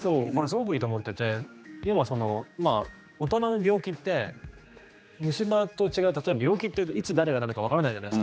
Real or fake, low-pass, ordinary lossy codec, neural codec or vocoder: fake; none; none; codec, 16 kHz, 2 kbps, X-Codec, HuBERT features, trained on general audio